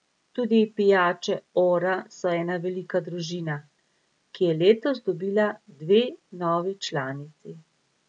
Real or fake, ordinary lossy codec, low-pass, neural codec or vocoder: real; none; 9.9 kHz; none